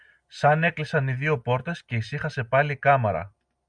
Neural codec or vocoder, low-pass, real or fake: none; 9.9 kHz; real